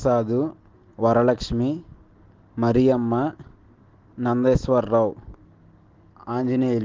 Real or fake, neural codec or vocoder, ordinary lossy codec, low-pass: real; none; Opus, 16 kbps; 7.2 kHz